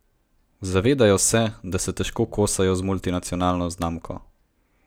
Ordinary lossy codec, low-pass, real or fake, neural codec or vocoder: none; none; real; none